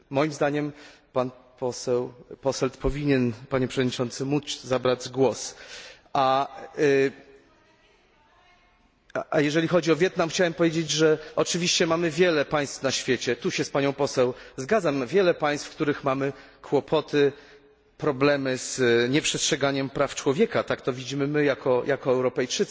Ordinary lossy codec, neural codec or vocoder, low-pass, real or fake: none; none; none; real